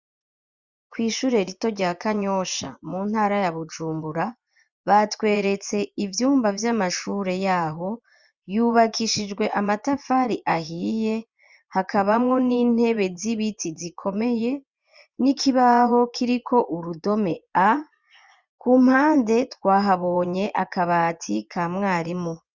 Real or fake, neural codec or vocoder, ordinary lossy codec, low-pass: fake; vocoder, 24 kHz, 100 mel bands, Vocos; Opus, 64 kbps; 7.2 kHz